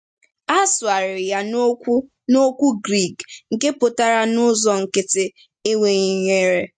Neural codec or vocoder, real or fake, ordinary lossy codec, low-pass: none; real; MP3, 48 kbps; 9.9 kHz